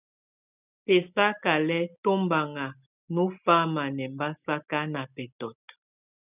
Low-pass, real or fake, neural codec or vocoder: 3.6 kHz; real; none